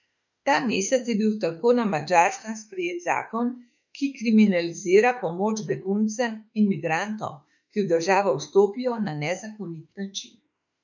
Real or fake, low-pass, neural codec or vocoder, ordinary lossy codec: fake; 7.2 kHz; autoencoder, 48 kHz, 32 numbers a frame, DAC-VAE, trained on Japanese speech; none